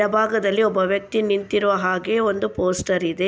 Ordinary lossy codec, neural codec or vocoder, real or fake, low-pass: none; none; real; none